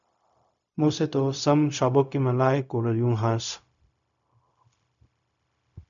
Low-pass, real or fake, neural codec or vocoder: 7.2 kHz; fake; codec, 16 kHz, 0.4 kbps, LongCat-Audio-Codec